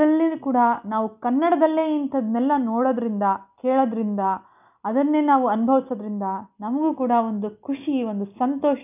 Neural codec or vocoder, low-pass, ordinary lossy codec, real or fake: none; 3.6 kHz; none; real